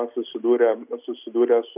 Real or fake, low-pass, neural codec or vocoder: real; 3.6 kHz; none